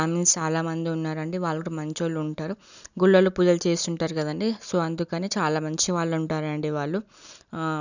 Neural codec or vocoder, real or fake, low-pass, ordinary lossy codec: none; real; 7.2 kHz; none